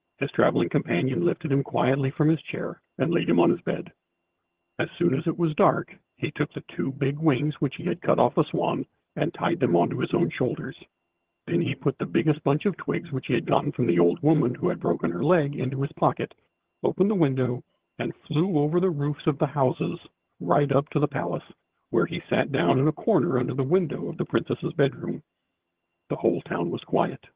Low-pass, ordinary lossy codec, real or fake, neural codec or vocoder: 3.6 kHz; Opus, 16 kbps; fake; vocoder, 22.05 kHz, 80 mel bands, HiFi-GAN